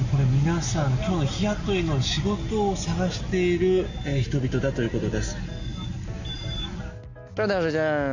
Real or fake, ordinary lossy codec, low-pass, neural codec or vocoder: real; none; 7.2 kHz; none